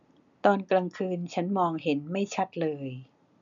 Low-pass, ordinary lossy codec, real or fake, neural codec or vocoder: 7.2 kHz; none; real; none